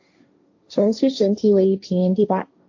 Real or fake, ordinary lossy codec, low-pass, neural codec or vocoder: fake; AAC, 48 kbps; 7.2 kHz; codec, 16 kHz, 1.1 kbps, Voila-Tokenizer